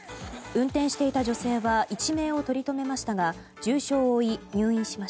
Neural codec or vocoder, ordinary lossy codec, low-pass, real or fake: none; none; none; real